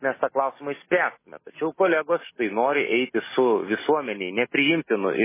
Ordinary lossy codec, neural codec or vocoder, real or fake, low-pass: MP3, 16 kbps; none; real; 3.6 kHz